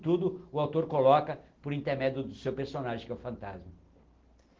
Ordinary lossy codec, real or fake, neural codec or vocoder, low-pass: Opus, 16 kbps; real; none; 7.2 kHz